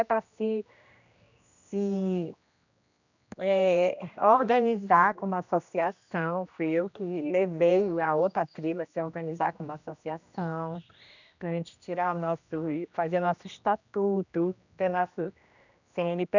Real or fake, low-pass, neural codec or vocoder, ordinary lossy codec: fake; 7.2 kHz; codec, 16 kHz, 1 kbps, X-Codec, HuBERT features, trained on general audio; none